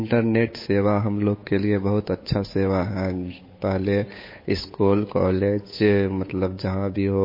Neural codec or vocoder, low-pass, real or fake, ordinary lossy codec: codec, 16 kHz, 8 kbps, FunCodec, trained on Chinese and English, 25 frames a second; 5.4 kHz; fake; MP3, 24 kbps